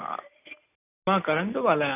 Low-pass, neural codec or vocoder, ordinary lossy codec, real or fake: 3.6 kHz; none; none; real